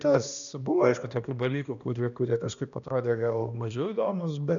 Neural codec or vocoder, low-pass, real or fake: codec, 16 kHz, 1 kbps, X-Codec, HuBERT features, trained on balanced general audio; 7.2 kHz; fake